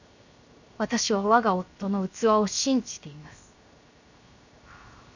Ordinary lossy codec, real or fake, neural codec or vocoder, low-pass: none; fake; codec, 16 kHz, 0.7 kbps, FocalCodec; 7.2 kHz